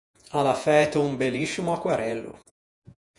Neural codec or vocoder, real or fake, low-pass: vocoder, 48 kHz, 128 mel bands, Vocos; fake; 10.8 kHz